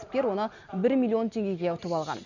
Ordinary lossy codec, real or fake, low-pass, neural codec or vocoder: none; real; 7.2 kHz; none